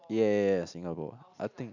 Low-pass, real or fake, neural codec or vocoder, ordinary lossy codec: 7.2 kHz; real; none; none